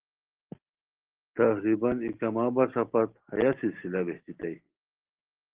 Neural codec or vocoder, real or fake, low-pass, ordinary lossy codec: none; real; 3.6 kHz; Opus, 16 kbps